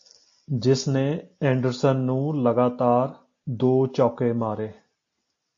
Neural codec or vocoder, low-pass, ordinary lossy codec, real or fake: none; 7.2 kHz; AAC, 48 kbps; real